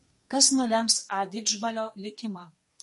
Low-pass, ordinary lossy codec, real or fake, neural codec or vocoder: 14.4 kHz; MP3, 48 kbps; fake; codec, 32 kHz, 1.9 kbps, SNAC